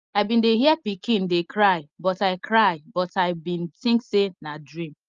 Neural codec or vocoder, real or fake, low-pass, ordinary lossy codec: none; real; 7.2 kHz; Opus, 32 kbps